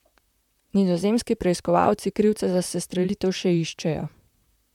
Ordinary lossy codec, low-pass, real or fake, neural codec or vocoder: MP3, 96 kbps; 19.8 kHz; fake; vocoder, 44.1 kHz, 128 mel bands, Pupu-Vocoder